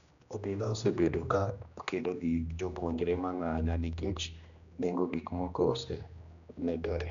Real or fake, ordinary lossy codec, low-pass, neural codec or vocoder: fake; none; 7.2 kHz; codec, 16 kHz, 1 kbps, X-Codec, HuBERT features, trained on general audio